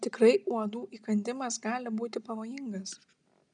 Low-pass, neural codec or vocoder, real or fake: 9.9 kHz; none; real